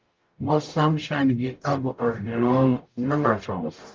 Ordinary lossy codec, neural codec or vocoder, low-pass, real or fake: Opus, 24 kbps; codec, 44.1 kHz, 0.9 kbps, DAC; 7.2 kHz; fake